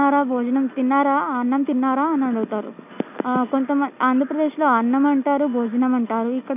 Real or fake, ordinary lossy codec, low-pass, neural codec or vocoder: real; none; 3.6 kHz; none